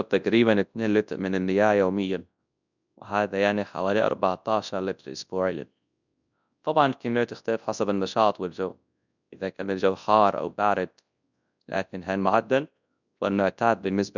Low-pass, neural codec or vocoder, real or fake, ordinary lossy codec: 7.2 kHz; codec, 24 kHz, 0.9 kbps, WavTokenizer, large speech release; fake; none